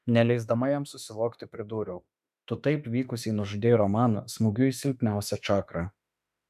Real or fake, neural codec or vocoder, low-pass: fake; autoencoder, 48 kHz, 32 numbers a frame, DAC-VAE, trained on Japanese speech; 14.4 kHz